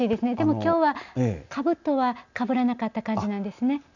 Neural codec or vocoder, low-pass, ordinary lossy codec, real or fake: none; 7.2 kHz; none; real